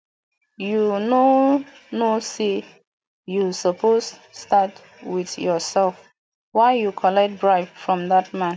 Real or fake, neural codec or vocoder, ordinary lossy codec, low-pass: real; none; none; none